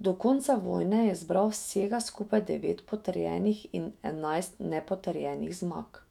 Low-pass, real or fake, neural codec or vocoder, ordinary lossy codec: 19.8 kHz; fake; autoencoder, 48 kHz, 128 numbers a frame, DAC-VAE, trained on Japanese speech; none